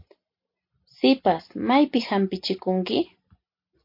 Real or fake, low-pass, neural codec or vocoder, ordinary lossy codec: real; 5.4 kHz; none; MP3, 32 kbps